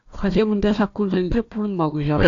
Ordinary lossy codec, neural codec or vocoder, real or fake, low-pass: AAC, 64 kbps; codec, 16 kHz, 1 kbps, FunCodec, trained on Chinese and English, 50 frames a second; fake; 7.2 kHz